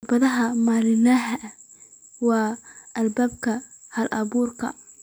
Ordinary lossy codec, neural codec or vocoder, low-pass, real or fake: none; none; none; real